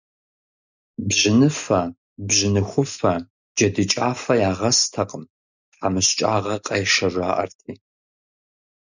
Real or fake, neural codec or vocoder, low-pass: real; none; 7.2 kHz